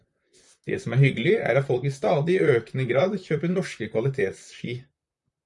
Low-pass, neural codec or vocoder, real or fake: 10.8 kHz; vocoder, 44.1 kHz, 128 mel bands, Pupu-Vocoder; fake